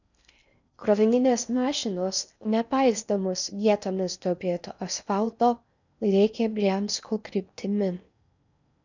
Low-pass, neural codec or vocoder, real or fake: 7.2 kHz; codec, 16 kHz in and 24 kHz out, 0.6 kbps, FocalCodec, streaming, 4096 codes; fake